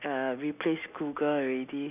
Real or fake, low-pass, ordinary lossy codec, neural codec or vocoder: real; 3.6 kHz; none; none